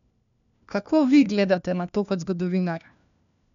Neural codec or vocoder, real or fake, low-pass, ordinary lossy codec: codec, 16 kHz, 1 kbps, FunCodec, trained on LibriTTS, 50 frames a second; fake; 7.2 kHz; none